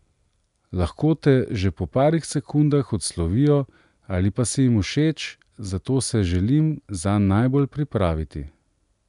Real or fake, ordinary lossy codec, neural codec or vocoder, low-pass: real; none; none; 10.8 kHz